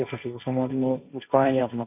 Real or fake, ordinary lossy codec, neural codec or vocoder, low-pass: fake; none; codec, 16 kHz, 1.1 kbps, Voila-Tokenizer; 3.6 kHz